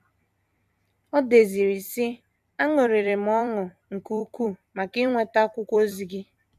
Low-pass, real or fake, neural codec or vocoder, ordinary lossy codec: 14.4 kHz; fake; vocoder, 44.1 kHz, 128 mel bands every 256 samples, BigVGAN v2; none